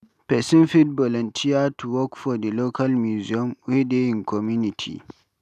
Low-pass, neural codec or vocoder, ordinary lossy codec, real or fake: 14.4 kHz; none; none; real